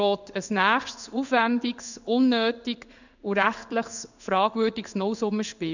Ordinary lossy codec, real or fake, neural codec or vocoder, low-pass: none; fake; codec, 16 kHz in and 24 kHz out, 1 kbps, XY-Tokenizer; 7.2 kHz